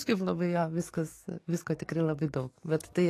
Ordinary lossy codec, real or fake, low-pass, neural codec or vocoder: AAC, 48 kbps; fake; 14.4 kHz; codec, 44.1 kHz, 7.8 kbps, DAC